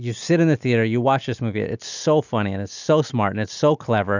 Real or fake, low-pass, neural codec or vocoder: real; 7.2 kHz; none